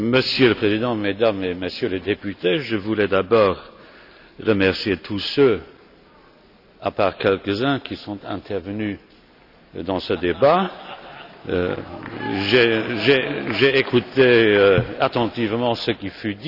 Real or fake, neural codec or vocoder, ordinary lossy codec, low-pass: real; none; none; 5.4 kHz